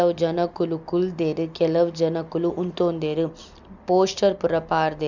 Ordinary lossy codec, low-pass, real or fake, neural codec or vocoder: none; 7.2 kHz; real; none